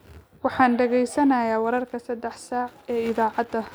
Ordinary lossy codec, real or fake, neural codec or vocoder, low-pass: none; real; none; none